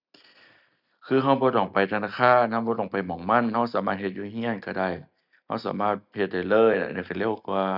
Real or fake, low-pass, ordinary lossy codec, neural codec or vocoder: real; 5.4 kHz; none; none